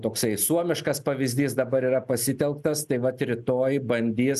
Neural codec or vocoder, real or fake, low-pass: none; real; 14.4 kHz